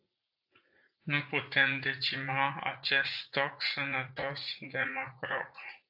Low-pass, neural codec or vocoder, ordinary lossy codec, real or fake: 5.4 kHz; vocoder, 44.1 kHz, 80 mel bands, Vocos; AAC, 48 kbps; fake